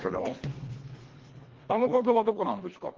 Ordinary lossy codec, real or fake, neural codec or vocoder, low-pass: Opus, 16 kbps; fake; codec, 24 kHz, 1.5 kbps, HILCodec; 7.2 kHz